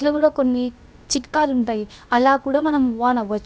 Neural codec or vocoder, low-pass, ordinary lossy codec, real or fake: codec, 16 kHz, about 1 kbps, DyCAST, with the encoder's durations; none; none; fake